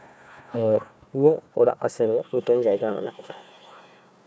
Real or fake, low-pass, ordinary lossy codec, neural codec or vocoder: fake; none; none; codec, 16 kHz, 1 kbps, FunCodec, trained on Chinese and English, 50 frames a second